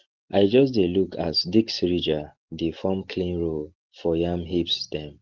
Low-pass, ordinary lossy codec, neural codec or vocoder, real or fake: 7.2 kHz; Opus, 16 kbps; none; real